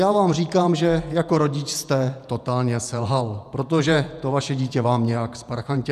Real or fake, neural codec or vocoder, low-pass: fake; vocoder, 48 kHz, 128 mel bands, Vocos; 14.4 kHz